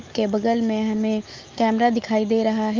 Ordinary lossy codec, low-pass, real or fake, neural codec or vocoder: Opus, 24 kbps; 7.2 kHz; fake; autoencoder, 48 kHz, 128 numbers a frame, DAC-VAE, trained on Japanese speech